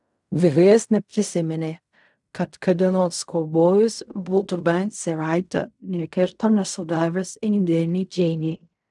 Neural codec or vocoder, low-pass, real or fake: codec, 16 kHz in and 24 kHz out, 0.4 kbps, LongCat-Audio-Codec, fine tuned four codebook decoder; 10.8 kHz; fake